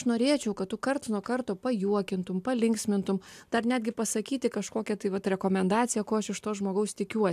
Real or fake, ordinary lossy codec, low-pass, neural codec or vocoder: real; AAC, 96 kbps; 14.4 kHz; none